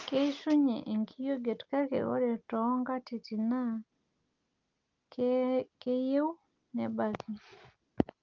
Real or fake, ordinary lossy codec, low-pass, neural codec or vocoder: real; Opus, 32 kbps; 7.2 kHz; none